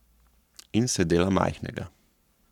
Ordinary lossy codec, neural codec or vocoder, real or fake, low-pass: none; codec, 44.1 kHz, 7.8 kbps, Pupu-Codec; fake; 19.8 kHz